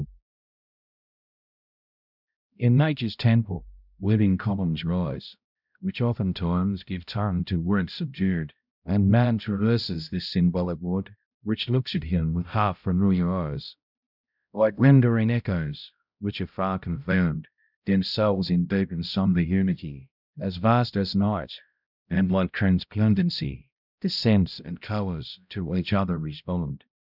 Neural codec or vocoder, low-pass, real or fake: codec, 16 kHz, 0.5 kbps, X-Codec, HuBERT features, trained on balanced general audio; 5.4 kHz; fake